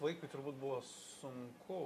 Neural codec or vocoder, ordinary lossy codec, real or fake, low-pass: none; MP3, 64 kbps; real; 14.4 kHz